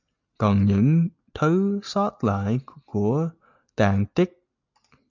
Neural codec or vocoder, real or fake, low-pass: none; real; 7.2 kHz